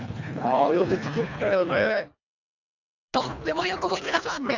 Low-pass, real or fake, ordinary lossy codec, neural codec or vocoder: 7.2 kHz; fake; none; codec, 24 kHz, 1.5 kbps, HILCodec